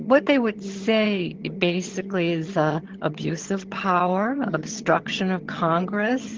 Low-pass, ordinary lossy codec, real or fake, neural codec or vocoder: 7.2 kHz; Opus, 16 kbps; fake; vocoder, 22.05 kHz, 80 mel bands, HiFi-GAN